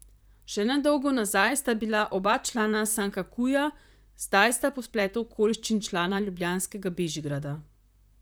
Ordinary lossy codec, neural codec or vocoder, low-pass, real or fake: none; vocoder, 44.1 kHz, 128 mel bands, Pupu-Vocoder; none; fake